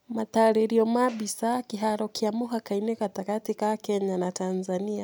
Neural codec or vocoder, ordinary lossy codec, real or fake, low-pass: none; none; real; none